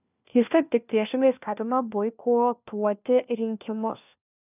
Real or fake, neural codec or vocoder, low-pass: fake; codec, 16 kHz, 1 kbps, FunCodec, trained on LibriTTS, 50 frames a second; 3.6 kHz